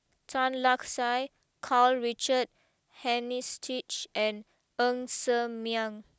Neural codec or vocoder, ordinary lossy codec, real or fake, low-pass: none; none; real; none